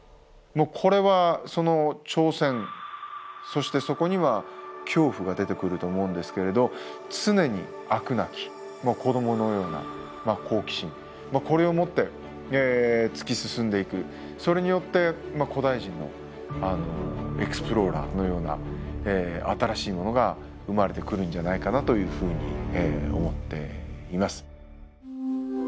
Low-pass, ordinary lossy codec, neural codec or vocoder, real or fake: none; none; none; real